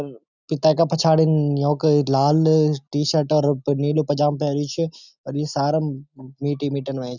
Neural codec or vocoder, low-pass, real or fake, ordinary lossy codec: none; 7.2 kHz; real; none